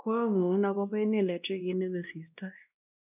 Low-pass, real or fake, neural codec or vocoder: 3.6 kHz; fake; codec, 16 kHz, 1 kbps, X-Codec, WavLM features, trained on Multilingual LibriSpeech